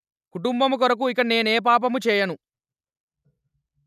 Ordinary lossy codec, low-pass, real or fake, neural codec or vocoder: none; 14.4 kHz; real; none